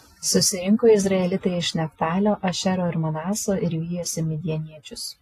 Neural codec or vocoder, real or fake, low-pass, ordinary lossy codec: none; real; 14.4 kHz; AAC, 48 kbps